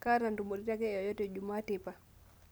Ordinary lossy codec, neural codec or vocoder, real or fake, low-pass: none; none; real; none